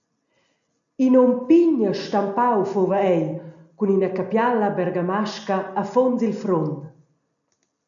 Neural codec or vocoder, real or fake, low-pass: none; real; 7.2 kHz